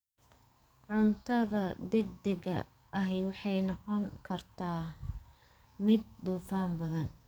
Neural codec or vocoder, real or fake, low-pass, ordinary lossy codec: codec, 44.1 kHz, 2.6 kbps, SNAC; fake; none; none